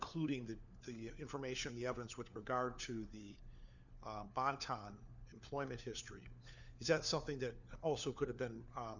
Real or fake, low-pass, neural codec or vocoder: fake; 7.2 kHz; codec, 16 kHz, 4 kbps, FunCodec, trained on LibriTTS, 50 frames a second